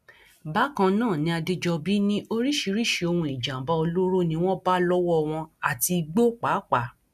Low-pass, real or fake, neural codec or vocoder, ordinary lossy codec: 14.4 kHz; real; none; none